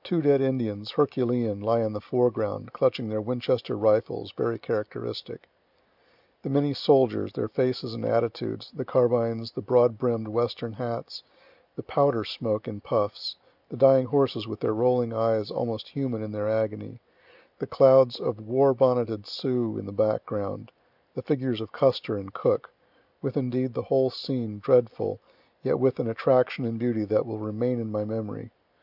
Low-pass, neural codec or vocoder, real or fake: 5.4 kHz; none; real